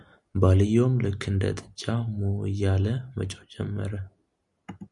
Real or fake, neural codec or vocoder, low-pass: real; none; 10.8 kHz